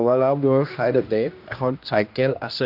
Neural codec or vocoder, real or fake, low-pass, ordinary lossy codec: codec, 16 kHz, 1 kbps, X-Codec, HuBERT features, trained on general audio; fake; 5.4 kHz; MP3, 48 kbps